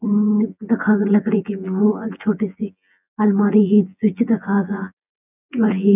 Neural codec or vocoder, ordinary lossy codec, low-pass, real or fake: vocoder, 24 kHz, 100 mel bands, Vocos; none; 3.6 kHz; fake